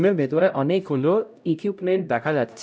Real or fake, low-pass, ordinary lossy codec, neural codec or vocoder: fake; none; none; codec, 16 kHz, 0.5 kbps, X-Codec, HuBERT features, trained on LibriSpeech